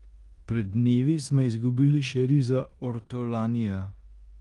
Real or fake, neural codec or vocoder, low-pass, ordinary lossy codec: fake; codec, 16 kHz in and 24 kHz out, 0.9 kbps, LongCat-Audio-Codec, four codebook decoder; 10.8 kHz; Opus, 32 kbps